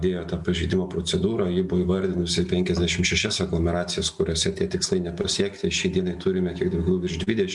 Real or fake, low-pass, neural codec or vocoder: real; 10.8 kHz; none